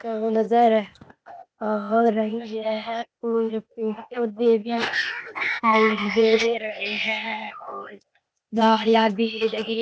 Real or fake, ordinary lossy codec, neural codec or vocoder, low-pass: fake; none; codec, 16 kHz, 0.8 kbps, ZipCodec; none